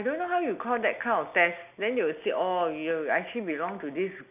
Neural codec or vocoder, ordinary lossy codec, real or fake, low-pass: none; none; real; 3.6 kHz